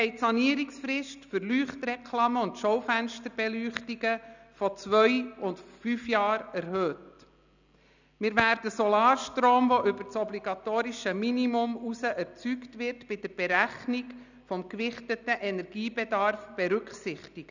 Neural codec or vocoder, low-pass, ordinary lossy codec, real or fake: none; 7.2 kHz; none; real